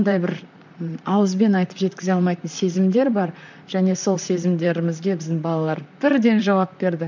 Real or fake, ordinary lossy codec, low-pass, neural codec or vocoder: fake; none; 7.2 kHz; vocoder, 44.1 kHz, 128 mel bands, Pupu-Vocoder